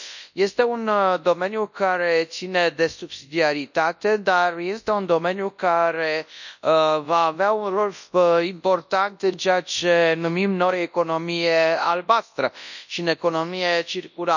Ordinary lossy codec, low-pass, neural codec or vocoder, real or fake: none; 7.2 kHz; codec, 24 kHz, 0.9 kbps, WavTokenizer, large speech release; fake